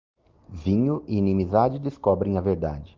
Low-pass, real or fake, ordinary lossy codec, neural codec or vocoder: 7.2 kHz; real; Opus, 32 kbps; none